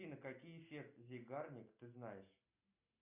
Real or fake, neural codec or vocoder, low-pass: real; none; 3.6 kHz